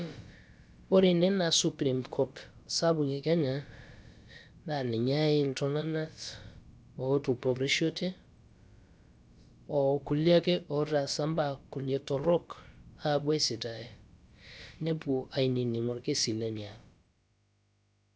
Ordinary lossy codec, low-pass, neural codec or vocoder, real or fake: none; none; codec, 16 kHz, about 1 kbps, DyCAST, with the encoder's durations; fake